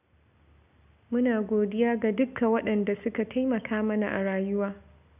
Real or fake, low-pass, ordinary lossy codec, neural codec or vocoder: real; 3.6 kHz; none; none